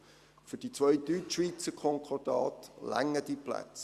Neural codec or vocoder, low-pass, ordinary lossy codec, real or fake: none; 14.4 kHz; Opus, 64 kbps; real